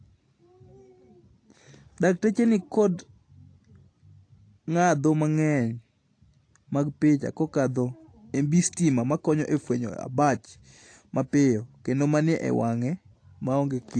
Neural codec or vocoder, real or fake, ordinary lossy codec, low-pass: none; real; AAC, 48 kbps; 9.9 kHz